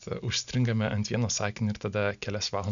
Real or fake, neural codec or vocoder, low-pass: real; none; 7.2 kHz